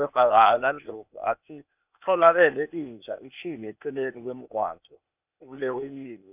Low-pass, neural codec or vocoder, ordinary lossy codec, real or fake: 3.6 kHz; codec, 16 kHz, 0.8 kbps, ZipCodec; none; fake